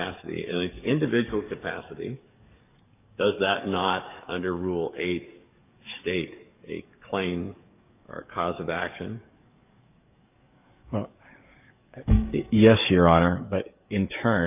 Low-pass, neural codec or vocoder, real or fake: 3.6 kHz; codec, 44.1 kHz, 7.8 kbps, DAC; fake